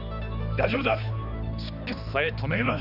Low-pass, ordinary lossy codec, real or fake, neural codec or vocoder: 5.4 kHz; none; fake; codec, 16 kHz, 2 kbps, X-Codec, HuBERT features, trained on balanced general audio